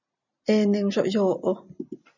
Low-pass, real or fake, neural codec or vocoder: 7.2 kHz; real; none